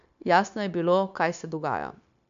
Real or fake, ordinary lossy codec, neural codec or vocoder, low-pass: fake; none; codec, 16 kHz, 0.9 kbps, LongCat-Audio-Codec; 7.2 kHz